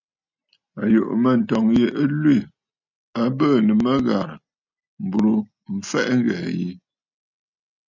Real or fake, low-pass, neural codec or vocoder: real; 7.2 kHz; none